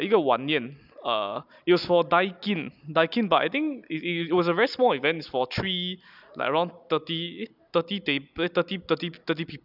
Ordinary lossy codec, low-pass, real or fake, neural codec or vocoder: none; 5.4 kHz; real; none